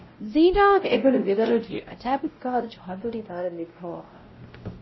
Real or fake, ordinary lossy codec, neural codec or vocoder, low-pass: fake; MP3, 24 kbps; codec, 16 kHz, 0.5 kbps, X-Codec, WavLM features, trained on Multilingual LibriSpeech; 7.2 kHz